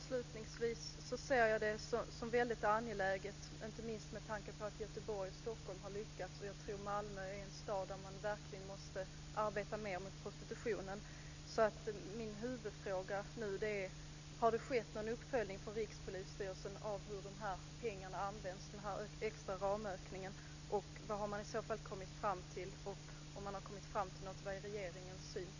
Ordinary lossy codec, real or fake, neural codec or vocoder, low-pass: none; real; none; 7.2 kHz